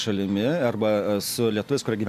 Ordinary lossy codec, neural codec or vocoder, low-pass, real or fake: AAC, 96 kbps; none; 14.4 kHz; real